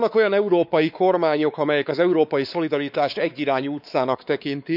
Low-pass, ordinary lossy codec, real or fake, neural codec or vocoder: 5.4 kHz; none; fake; codec, 16 kHz, 4 kbps, X-Codec, WavLM features, trained on Multilingual LibriSpeech